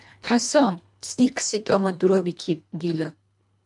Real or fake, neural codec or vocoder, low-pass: fake; codec, 24 kHz, 1.5 kbps, HILCodec; 10.8 kHz